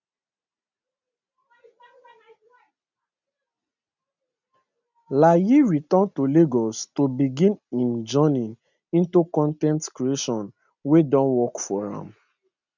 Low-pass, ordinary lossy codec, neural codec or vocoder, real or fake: 7.2 kHz; none; none; real